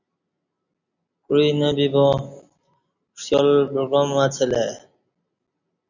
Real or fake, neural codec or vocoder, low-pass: real; none; 7.2 kHz